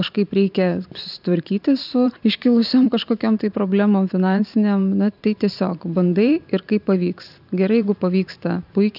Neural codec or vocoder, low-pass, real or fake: vocoder, 44.1 kHz, 128 mel bands every 512 samples, BigVGAN v2; 5.4 kHz; fake